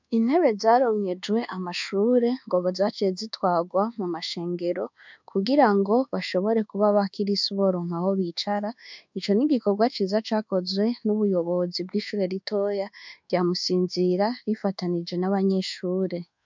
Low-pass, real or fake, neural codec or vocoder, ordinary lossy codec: 7.2 kHz; fake; codec, 24 kHz, 1.2 kbps, DualCodec; MP3, 64 kbps